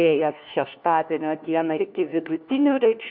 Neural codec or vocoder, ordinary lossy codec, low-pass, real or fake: codec, 16 kHz, 1 kbps, FunCodec, trained on LibriTTS, 50 frames a second; MP3, 48 kbps; 5.4 kHz; fake